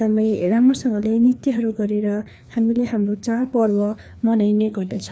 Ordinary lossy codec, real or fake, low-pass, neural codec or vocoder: none; fake; none; codec, 16 kHz, 2 kbps, FreqCodec, larger model